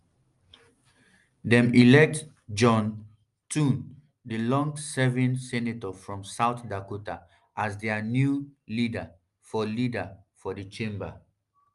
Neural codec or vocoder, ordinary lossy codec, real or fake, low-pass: none; Opus, 32 kbps; real; 10.8 kHz